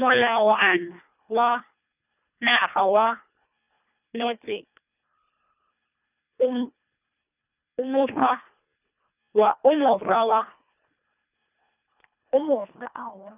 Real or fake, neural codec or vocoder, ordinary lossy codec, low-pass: fake; codec, 24 kHz, 1.5 kbps, HILCodec; none; 3.6 kHz